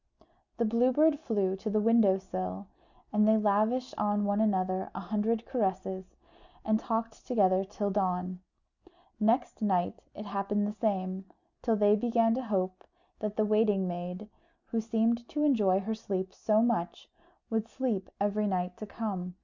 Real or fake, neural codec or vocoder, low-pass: real; none; 7.2 kHz